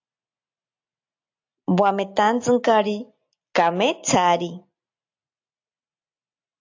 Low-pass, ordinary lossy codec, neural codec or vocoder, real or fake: 7.2 kHz; AAC, 48 kbps; none; real